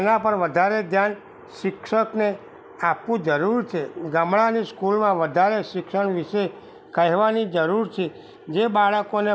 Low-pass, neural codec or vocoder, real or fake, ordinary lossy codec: none; none; real; none